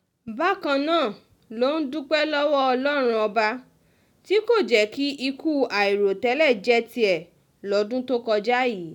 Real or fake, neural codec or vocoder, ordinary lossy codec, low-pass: real; none; none; 19.8 kHz